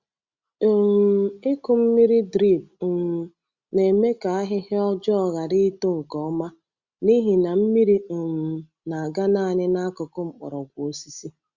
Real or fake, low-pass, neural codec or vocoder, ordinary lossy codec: real; 7.2 kHz; none; Opus, 64 kbps